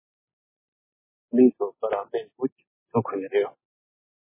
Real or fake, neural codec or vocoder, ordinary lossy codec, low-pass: real; none; MP3, 16 kbps; 3.6 kHz